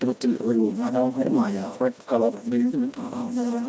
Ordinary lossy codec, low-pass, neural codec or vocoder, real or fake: none; none; codec, 16 kHz, 1 kbps, FreqCodec, smaller model; fake